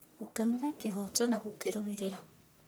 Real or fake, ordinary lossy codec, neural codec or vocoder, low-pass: fake; none; codec, 44.1 kHz, 1.7 kbps, Pupu-Codec; none